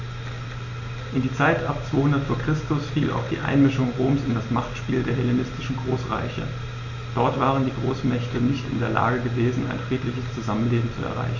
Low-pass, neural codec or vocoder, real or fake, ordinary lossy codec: 7.2 kHz; none; real; none